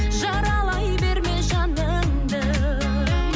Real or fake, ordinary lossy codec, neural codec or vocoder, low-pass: real; none; none; none